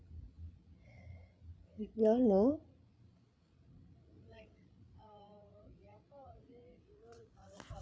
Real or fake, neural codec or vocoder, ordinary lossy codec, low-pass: fake; codec, 16 kHz, 16 kbps, FreqCodec, larger model; none; none